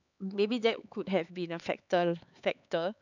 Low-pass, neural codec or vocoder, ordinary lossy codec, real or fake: 7.2 kHz; codec, 16 kHz, 4 kbps, X-Codec, HuBERT features, trained on LibriSpeech; none; fake